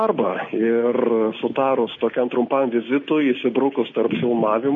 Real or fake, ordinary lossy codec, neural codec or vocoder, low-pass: real; MP3, 32 kbps; none; 7.2 kHz